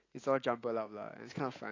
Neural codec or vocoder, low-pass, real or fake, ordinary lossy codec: none; 7.2 kHz; real; AAC, 32 kbps